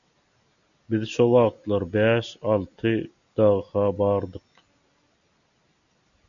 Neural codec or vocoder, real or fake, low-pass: none; real; 7.2 kHz